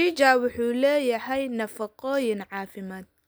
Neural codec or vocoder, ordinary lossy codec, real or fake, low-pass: none; none; real; none